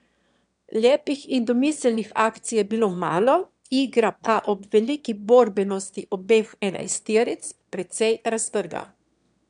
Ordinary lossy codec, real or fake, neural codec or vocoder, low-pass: MP3, 96 kbps; fake; autoencoder, 22.05 kHz, a latent of 192 numbers a frame, VITS, trained on one speaker; 9.9 kHz